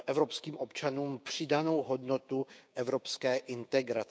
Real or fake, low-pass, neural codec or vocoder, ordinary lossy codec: fake; none; codec, 16 kHz, 6 kbps, DAC; none